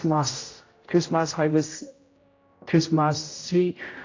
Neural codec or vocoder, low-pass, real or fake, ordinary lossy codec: codec, 16 kHz, 0.5 kbps, X-Codec, HuBERT features, trained on general audio; 7.2 kHz; fake; AAC, 32 kbps